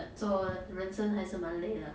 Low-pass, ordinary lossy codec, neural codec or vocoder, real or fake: none; none; none; real